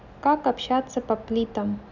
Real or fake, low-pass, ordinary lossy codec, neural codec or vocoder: real; 7.2 kHz; none; none